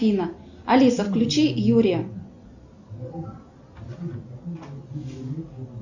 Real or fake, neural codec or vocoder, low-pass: real; none; 7.2 kHz